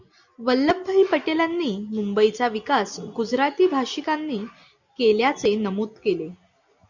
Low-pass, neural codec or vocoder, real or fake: 7.2 kHz; none; real